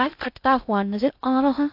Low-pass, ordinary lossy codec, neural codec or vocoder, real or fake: 5.4 kHz; AAC, 48 kbps; codec, 16 kHz in and 24 kHz out, 0.6 kbps, FocalCodec, streaming, 2048 codes; fake